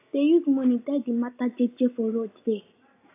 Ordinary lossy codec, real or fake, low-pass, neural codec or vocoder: AAC, 24 kbps; real; 3.6 kHz; none